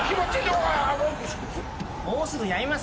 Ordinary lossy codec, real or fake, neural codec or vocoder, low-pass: none; real; none; none